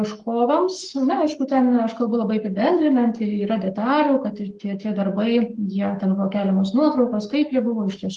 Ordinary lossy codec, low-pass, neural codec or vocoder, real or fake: Opus, 24 kbps; 10.8 kHz; codec, 44.1 kHz, 7.8 kbps, Pupu-Codec; fake